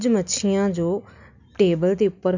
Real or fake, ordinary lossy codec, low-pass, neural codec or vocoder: real; none; 7.2 kHz; none